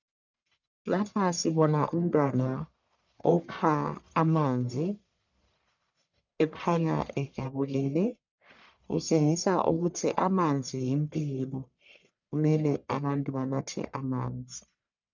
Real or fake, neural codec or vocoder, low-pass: fake; codec, 44.1 kHz, 1.7 kbps, Pupu-Codec; 7.2 kHz